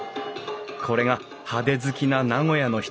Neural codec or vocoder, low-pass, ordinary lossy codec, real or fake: none; none; none; real